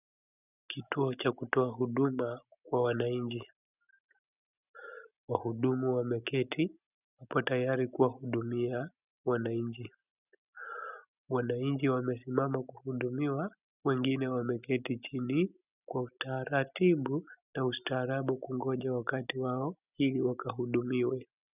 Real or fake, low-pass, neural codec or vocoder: real; 3.6 kHz; none